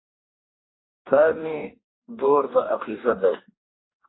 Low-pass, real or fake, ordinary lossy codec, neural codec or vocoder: 7.2 kHz; fake; AAC, 16 kbps; codec, 44.1 kHz, 2.6 kbps, DAC